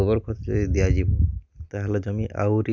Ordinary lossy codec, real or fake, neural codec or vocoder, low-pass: none; real; none; 7.2 kHz